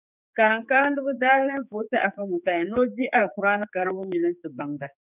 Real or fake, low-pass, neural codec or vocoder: fake; 3.6 kHz; codec, 16 kHz, 4 kbps, X-Codec, HuBERT features, trained on general audio